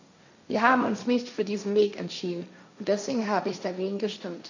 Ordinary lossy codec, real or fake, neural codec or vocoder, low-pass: none; fake; codec, 16 kHz, 1.1 kbps, Voila-Tokenizer; 7.2 kHz